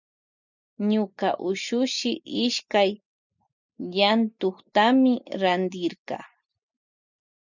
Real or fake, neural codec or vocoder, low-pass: real; none; 7.2 kHz